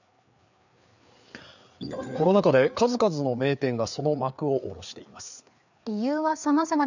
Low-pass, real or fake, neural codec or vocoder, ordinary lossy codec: 7.2 kHz; fake; codec, 16 kHz, 4 kbps, FreqCodec, larger model; none